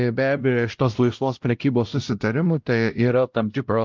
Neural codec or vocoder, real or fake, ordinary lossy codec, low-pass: codec, 16 kHz, 0.5 kbps, X-Codec, WavLM features, trained on Multilingual LibriSpeech; fake; Opus, 24 kbps; 7.2 kHz